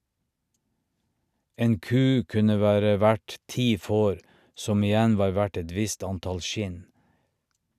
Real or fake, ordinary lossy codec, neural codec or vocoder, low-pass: real; none; none; 14.4 kHz